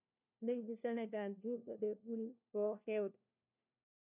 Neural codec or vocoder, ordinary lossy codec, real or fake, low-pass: codec, 16 kHz, 1 kbps, FunCodec, trained on LibriTTS, 50 frames a second; none; fake; 3.6 kHz